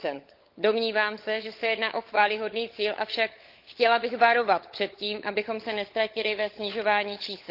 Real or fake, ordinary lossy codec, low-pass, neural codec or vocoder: fake; Opus, 32 kbps; 5.4 kHz; codec, 16 kHz, 16 kbps, FunCodec, trained on LibriTTS, 50 frames a second